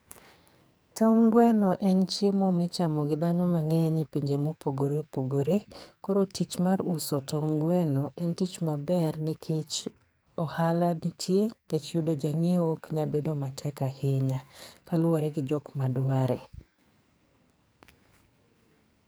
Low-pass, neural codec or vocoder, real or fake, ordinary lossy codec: none; codec, 44.1 kHz, 2.6 kbps, SNAC; fake; none